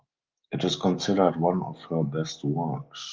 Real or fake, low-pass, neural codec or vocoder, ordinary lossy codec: real; 7.2 kHz; none; Opus, 32 kbps